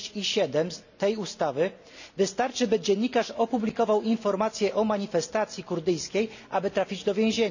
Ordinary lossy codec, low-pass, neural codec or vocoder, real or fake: none; 7.2 kHz; none; real